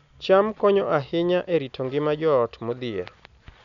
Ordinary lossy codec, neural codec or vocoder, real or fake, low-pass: none; none; real; 7.2 kHz